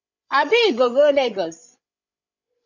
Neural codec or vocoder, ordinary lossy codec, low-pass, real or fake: codec, 16 kHz, 16 kbps, FreqCodec, larger model; MP3, 64 kbps; 7.2 kHz; fake